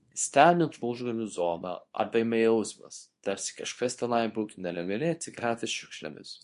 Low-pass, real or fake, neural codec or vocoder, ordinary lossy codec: 10.8 kHz; fake; codec, 24 kHz, 0.9 kbps, WavTokenizer, small release; MP3, 48 kbps